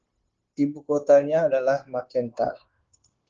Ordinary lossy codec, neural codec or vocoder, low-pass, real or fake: Opus, 16 kbps; codec, 16 kHz, 0.9 kbps, LongCat-Audio-Codec; 7.2 kHz; fake